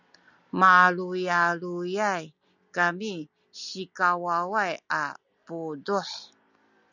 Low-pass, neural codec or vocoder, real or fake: 7.2 kHz; none; real